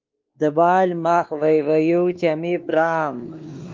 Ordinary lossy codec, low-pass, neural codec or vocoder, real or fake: Opus, 32 kbps; 7.2 kHz; codec, 16 kHz, 2 kbps, X-Codec, WavLM features, trained on Multilingual LibriSpeech; fake